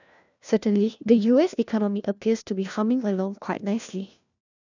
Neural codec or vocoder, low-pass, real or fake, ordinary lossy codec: codec, 16 kHz, 1 kbps, FunCodec, trained on LibriTTS, 50 frames a second; 7.2 kHz; fake; none